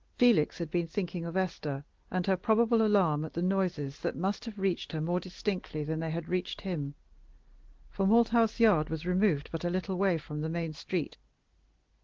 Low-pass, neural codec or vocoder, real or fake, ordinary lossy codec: 7.2 kHz; none; real; Opus, 16 kbps